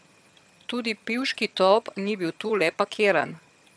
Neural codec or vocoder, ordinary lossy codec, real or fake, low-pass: vocoder, 22.05 kHz, 80 mel bands, HiFi-GAN; none; fake; none